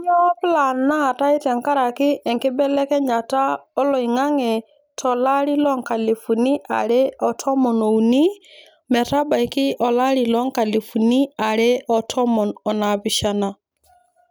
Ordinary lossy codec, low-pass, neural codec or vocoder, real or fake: none; none; none; real